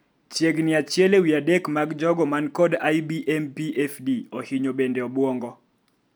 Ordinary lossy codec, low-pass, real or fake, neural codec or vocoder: none; none; real; none